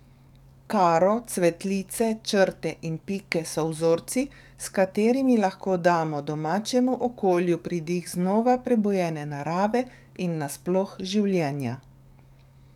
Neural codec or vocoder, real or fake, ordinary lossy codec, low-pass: codec, 44.1 kHz, 7.8 kbps, DAC; fake; none; 19.8 kHz